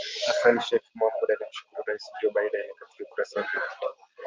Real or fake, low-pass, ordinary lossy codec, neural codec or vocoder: real; 7.2 kHz; Opus, 24 kbps; none